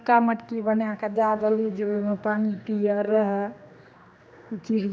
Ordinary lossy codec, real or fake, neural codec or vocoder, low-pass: none; fake; codec, 16 kHz, 2 kbps, X-Codec, HuBERT features, trained on general audio; none